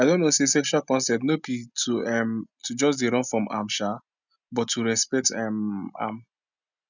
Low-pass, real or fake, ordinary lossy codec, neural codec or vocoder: 7.2 kHz; real; none; none